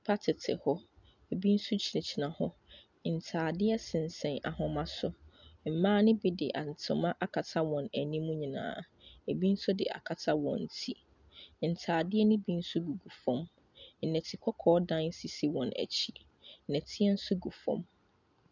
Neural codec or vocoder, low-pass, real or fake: none; 7.2 kHz; real